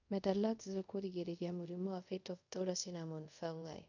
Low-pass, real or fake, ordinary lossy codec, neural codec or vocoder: 7.2 kHz; fake; none; codec, 24 kHz, 0.5 kbps, DualCodec